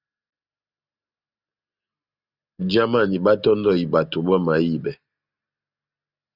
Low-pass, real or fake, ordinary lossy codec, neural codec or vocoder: 5.4 kHz; real; Opus, 64 kbps; none